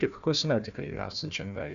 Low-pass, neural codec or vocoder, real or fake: 7.2 kHz; codec, 16 kHz, 1 kbps, FunCodec, trained on Chinese and English, 50 frames a second; fake